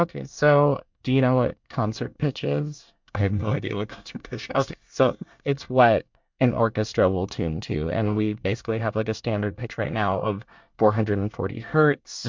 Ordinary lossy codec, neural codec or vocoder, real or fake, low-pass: MP3, 64 kbps; codec, 24 kHz, 1 kbps, SNAC; fake; 7.2 kHz